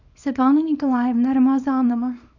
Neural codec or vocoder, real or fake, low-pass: codec, 24 kHz, 0.9 kbps, WavTokenizer, small release; fake; 7.2 kHz